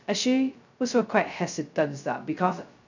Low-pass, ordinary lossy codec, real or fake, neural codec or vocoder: 7.2 kHz; none; fake; codec, 16 kHz, 0.2 kbps, FocalCodec